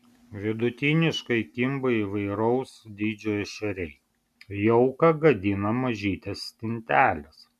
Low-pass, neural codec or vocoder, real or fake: 14.4 kHz; none; real